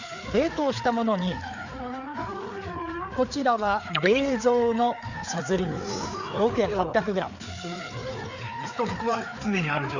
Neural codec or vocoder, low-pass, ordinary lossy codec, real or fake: codec, 16 kHz, 4 kbps, FreqCodec, larger model; 7.2 kHz; none; fake